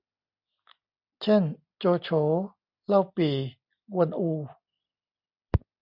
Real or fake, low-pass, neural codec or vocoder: real; 5.4 kHz; none